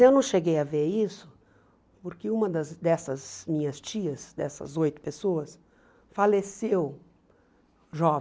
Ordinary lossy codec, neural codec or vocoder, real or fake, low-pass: none; none; real; none